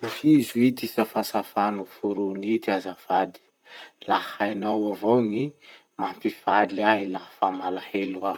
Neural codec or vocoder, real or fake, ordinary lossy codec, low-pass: vocoder, 44.1 kHz, 128 mel bands, Pupu-Vocoder; fake; none; 19.8 kHz